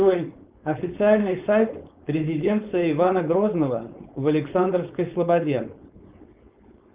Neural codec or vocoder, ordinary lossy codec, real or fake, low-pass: codec, 16 kHz, 4.8 kbps, FACodec; Opus, 24 kbps; fake; 3.6 kHz